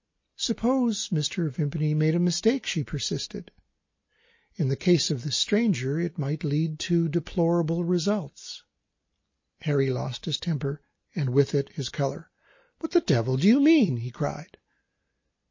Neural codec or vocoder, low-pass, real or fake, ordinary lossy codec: none; 7.2 kHz; real; MP3, 32 kbps